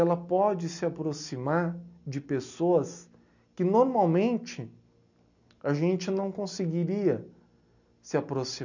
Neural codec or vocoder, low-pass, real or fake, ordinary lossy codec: none; 7.2 kHz; real; none